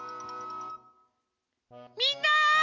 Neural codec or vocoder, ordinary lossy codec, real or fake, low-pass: none; none; real; 7.2 kHz